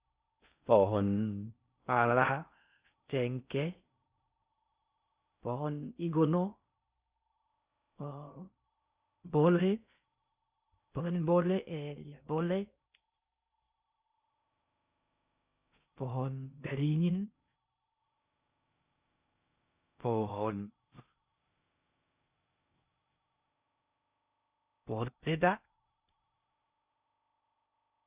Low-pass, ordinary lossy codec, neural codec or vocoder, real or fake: 3.6 kHz; Opus, 64 kbps; codec, 16 kHz in and 24 kHz out, 0.6 kbps, FocalCodec, streaming, 4096 codes; fake